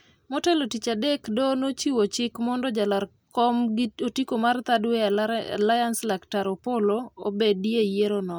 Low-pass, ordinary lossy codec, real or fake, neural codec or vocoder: none; none; real; none